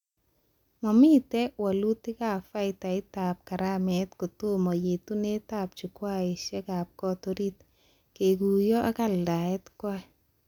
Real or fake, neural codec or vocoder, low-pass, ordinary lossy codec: real; none; 19.8 kHz; none